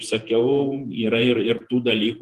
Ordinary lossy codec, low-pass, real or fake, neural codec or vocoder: Opus, 24 kbps; 14.4 kHz; fake; vocoder, 48 kHz, 128 mel bands, Vocos